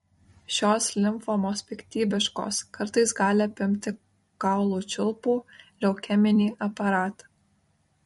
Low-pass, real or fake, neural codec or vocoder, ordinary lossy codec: 19.8 kHz; fake; vocoder, 44.1 kHz, 128 mel bands every 256 samples, BigVGAN v2; MP3, 48 kbps